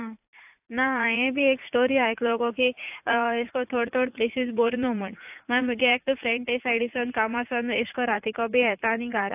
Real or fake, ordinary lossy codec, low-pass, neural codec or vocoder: fake; AAC, 32 kbps; 3.6 kHz; vocoder, 44.1 kHz, 80 mel bands, Vocos